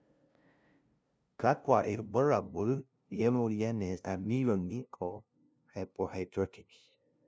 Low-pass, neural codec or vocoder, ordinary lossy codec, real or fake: none; codec, 16 kHz, 0.5 kbps, FunCodec, trained on LibriTTS, 25 frames a second; none; fake